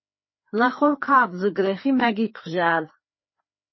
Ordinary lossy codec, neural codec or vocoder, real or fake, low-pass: MP3, 24 kbps; codec, 16 kHz, 2 kbps, FreqCodec, larger model; fake; 7.2 kHz